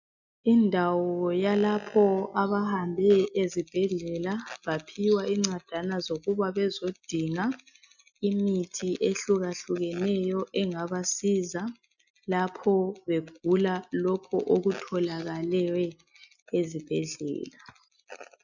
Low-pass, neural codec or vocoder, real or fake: 7.2 kHz; none; real